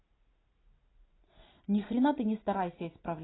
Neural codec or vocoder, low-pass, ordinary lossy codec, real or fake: none; 7.2 kHz; AAC, 16 kbps; real